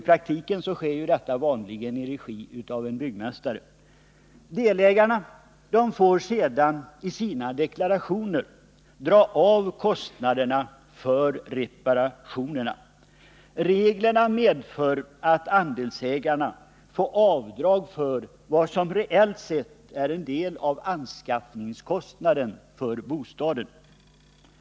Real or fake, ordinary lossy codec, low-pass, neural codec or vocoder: real; none; none; none